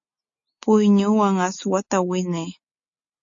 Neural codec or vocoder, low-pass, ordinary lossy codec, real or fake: none; 7.2 kHz; AAC, 48 kbps; real